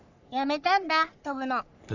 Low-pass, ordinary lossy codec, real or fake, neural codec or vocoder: 7.2 kHz; none; fake; codec, 44.1 kHz, 3.4 kbps, Pupu-Codec